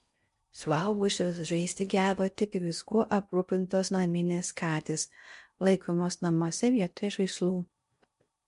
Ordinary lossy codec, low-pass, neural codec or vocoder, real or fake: MP3, 64 kbps; 10.8 kHz; codec, 16 kHz in and 24 kHz out, 0.6 kbps, FocalCodec, streaming, 4096 codes; fake